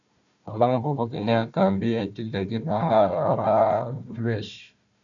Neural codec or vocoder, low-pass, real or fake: codec, 16 kHz, 1 kbps, FunCodec, trained on Chinese and English, 50 frames a second; 7.2 kHz; fake